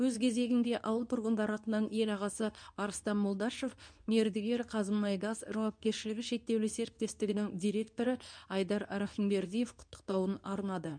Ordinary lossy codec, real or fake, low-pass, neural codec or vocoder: none; fake; 9.9 kHz; codec, 24 kHz, 0.9 kbps, WavTokenizer, medium speech release version 2